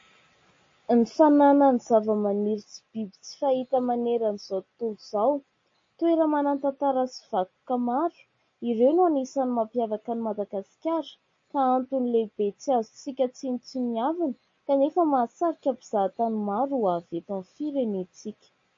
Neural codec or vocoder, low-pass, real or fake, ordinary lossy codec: none; 7.2 kHz; real; MP3, 32 kbps